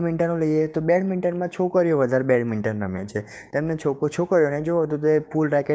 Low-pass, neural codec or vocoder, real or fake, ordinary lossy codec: none; codec, 16 kHz, 6 kbps, DAC; fake; none